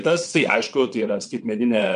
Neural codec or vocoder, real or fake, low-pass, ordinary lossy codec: vocoder, 22.05 kHz, 80 mel bands, WaveNeXt; fake; 9.9 kHz; MP3, 64 kbps